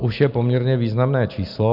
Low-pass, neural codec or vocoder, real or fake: 5.4 kHz; none; real